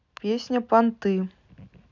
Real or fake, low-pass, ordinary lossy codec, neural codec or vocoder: real; 7.2 kHz; none; none